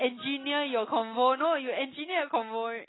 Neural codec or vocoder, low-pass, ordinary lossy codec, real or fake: none; 7.2 kHz; AAC, 16 kbps; real